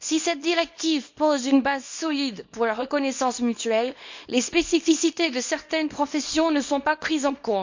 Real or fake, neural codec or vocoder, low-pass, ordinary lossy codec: fake; codec, 24 kHz, 0.9 kbps, WavTokenizer, small release; 7.2 kHz; MP3, 48 kbps